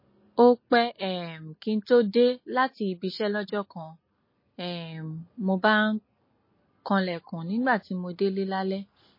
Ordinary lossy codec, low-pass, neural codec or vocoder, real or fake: MP3, 24 kbps; 5.4 kHz; none; real